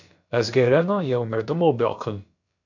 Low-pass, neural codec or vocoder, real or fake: 7.2 kHz; codec, 16 kHz, about 1 kbps, DyCAST, with the encoder's durations; fake